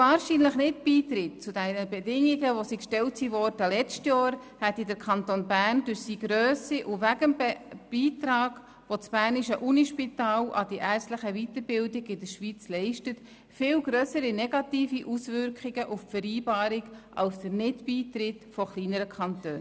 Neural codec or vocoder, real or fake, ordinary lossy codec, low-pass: none; real; none; none